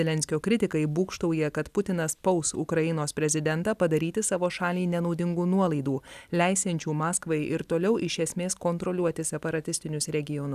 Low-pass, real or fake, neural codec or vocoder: 14.4 kHz; real; none